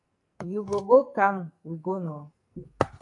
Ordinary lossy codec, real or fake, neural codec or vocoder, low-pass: MP3, 64 kbps; fake; codec, 44.1 kHz, 2.6 kbps, SNAC; 10.8 kHz